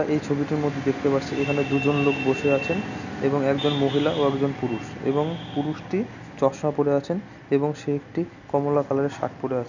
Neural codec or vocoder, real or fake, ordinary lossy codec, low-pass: none; real; none; 7.2 kHz